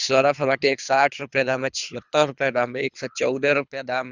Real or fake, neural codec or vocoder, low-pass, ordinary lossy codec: fake; codec, 24 kHz, 3 kbps, HILCodec; 7.2 kHz; Opus, 64 kbps